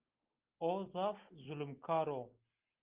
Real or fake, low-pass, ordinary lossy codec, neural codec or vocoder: real; 3.6 kHz; Opus, 32 kbps; none